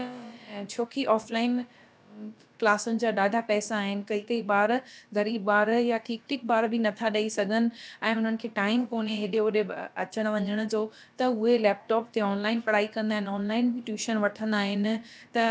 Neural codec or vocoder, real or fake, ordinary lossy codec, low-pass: codec, 16 kHz, about 1 kbps, DyCAST, with the encoder's durations; fake; none; none